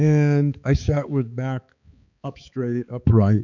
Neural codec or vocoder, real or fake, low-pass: codec, 16 kHz, 4 kbps, X-Codec, HuBERT features, trained on balanced general audio; fake; 7.2 kHz